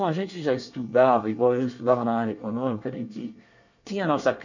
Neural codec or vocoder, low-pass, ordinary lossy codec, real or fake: codec, 24 kHz, 1 kbps, SNAC; 7.2 kHz; none; fake